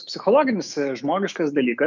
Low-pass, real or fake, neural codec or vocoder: 7.2 kHz; fake; autoencoder, 48 kHz, 128 numbers a frame, DAC-VAE, trained on Japanese speech